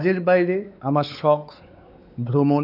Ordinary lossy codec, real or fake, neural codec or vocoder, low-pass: none; fake; codec, 16 kHz, 4 kbps, X-Codec, WavLM features, trained on Multilingual LibriSpeech; 5.4 kHz